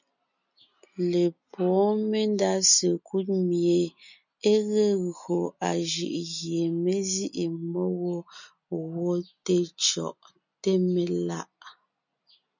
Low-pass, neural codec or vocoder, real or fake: 7.2 kHz; none; real